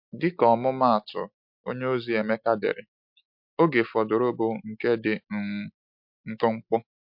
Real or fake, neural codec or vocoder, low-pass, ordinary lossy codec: real; none; 5.4 kHz; MP3, 48 kbps